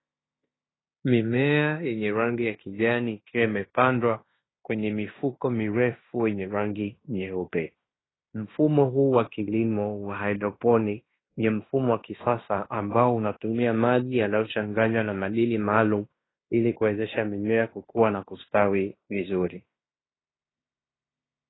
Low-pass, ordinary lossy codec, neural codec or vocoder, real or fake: 7.2 kHz; AAC, 16 kbps; codec, 16 kHz in and 24 kHz out, 0.9 kbps, LongCat-Audio-Codec, fine tuned four codebook decoder; fake